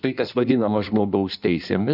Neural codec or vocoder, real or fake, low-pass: codec, 16 kHz in and 24 kHz out, 1.1 kbps, FireRedTTS-2 codec; fake; 5.4 kHz